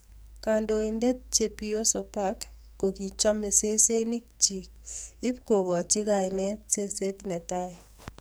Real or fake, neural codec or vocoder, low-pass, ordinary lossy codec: fake; codec, 44.1 kHz, 2.6 kbps, SNAC; none; none